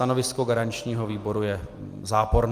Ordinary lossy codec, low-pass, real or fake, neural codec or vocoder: Opus, 32 kbps; 14.4 kHz; real; none